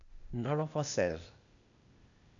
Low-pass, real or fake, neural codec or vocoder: 7.2 kHz; fake; codec, 16 kHz, 0.8 kbps, ZipCodec